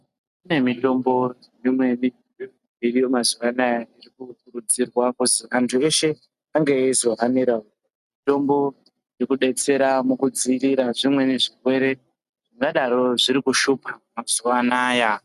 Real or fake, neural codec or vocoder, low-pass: real; none; 14.4 kHz